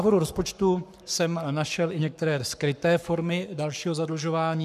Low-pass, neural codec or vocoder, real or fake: 14.4 kHz; codec, 44.1 kHz, 7.8 kbps, Pupu-Codec; fake